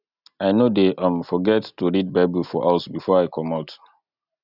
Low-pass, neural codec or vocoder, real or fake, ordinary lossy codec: 5.4 kHz; none; real; none